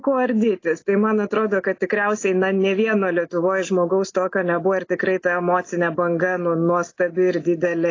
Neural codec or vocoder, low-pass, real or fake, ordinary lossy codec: none; 7.2 kHz; real; AAC, 32 kbps